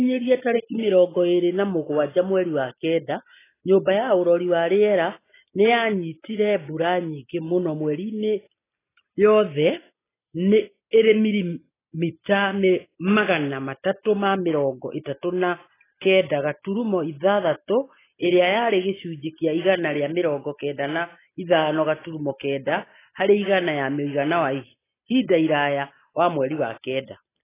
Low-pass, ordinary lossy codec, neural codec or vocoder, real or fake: 3.6 kHz; AAC, 16 kbps; none; real